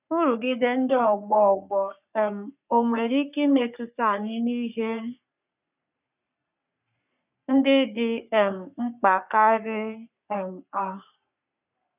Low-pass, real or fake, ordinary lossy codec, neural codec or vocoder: 3.6 kHz; fake; none; codec, 44.1 kHz, 3.4 kbps, Pupu-Codec